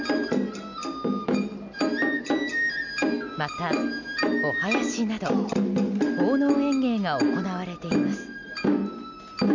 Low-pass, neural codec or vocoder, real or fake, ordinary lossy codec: 7.2 kHz; none; real; none